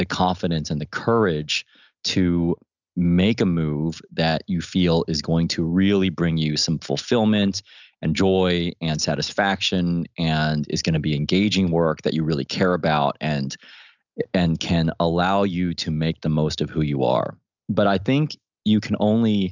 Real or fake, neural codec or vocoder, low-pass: real; none; 7.2 kHz